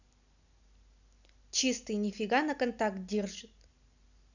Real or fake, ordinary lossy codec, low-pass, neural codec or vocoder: real; none; 7.2 kHz; none